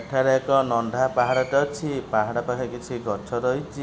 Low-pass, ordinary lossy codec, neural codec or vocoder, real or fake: none; none; none; real